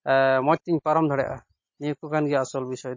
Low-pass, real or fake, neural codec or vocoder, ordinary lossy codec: 7.2 kHz; real; none; MP3, 32 kbps